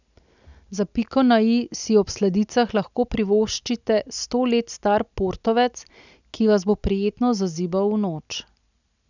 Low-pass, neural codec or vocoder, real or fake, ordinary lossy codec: 7.2 kHz; none; real; none